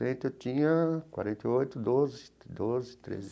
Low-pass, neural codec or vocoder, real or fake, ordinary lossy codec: none; none; real; none